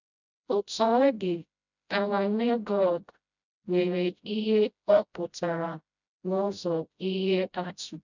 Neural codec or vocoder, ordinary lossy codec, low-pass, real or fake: codec, 16 kHz, 0.5 kbps, FreqCodec, smaller model; none; 7.2 kHz; fake